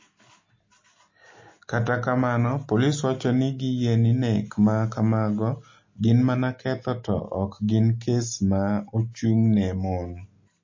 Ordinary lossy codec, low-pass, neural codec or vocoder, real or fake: MP3, 32 kbps; 7.2 kHz; none; real